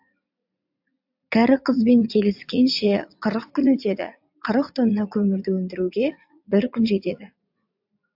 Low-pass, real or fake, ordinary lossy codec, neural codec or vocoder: 5.4 kHz; fake; AAC, 48 kbps; vocoder, 44.1 kHz, 80 mel bands, Vocos